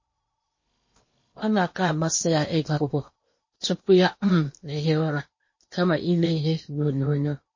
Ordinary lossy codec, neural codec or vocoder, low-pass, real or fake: MP3, 32 kbps; codec, 16 kHz in and 24 kHz out, 0.8 kbps, FocalCodec, streaming, 65536 codes; 7.2 kHz; fake